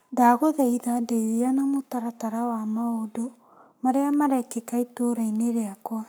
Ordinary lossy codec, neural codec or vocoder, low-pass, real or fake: none; codec, 44.1 kHz, 7.8 kbps, Pupu-Codec; none; fake